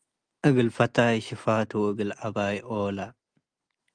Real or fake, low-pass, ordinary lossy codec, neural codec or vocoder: real; 9.9 kHz; Opus, 32 kbps; none